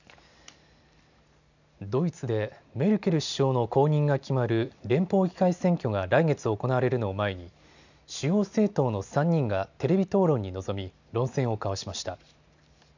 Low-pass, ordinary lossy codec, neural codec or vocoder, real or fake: 7.2 kHz; none; none; real